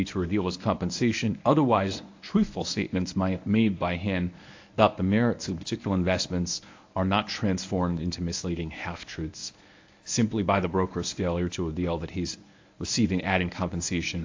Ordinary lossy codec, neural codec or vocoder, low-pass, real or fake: AAC, 48 kbps; codec, 24 kHz, 0.9 kbps, WavTokenizer, medium speech release version 1; 7.2 kHz; fake